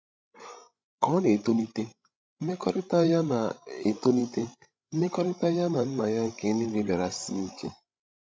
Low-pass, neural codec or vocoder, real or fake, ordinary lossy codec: none; codec, 16 kHz, 16 kbps, FreqCodec, larger model; fake; none